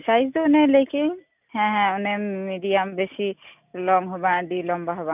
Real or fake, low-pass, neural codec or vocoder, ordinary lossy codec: real; 3.6 kHz; none; none